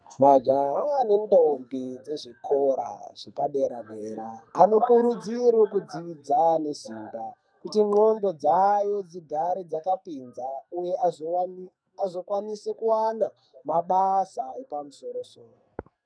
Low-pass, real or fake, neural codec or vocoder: 9.9 kHz; fake; codec, 44.1 kHz, 2.6 kbps, SNAC